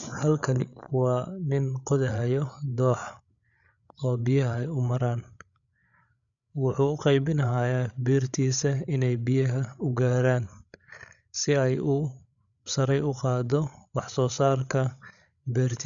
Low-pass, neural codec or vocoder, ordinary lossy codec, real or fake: 7.2 kHz; codec, 16 kHz, 8 kbps, FreqCodec, larger model; none; fake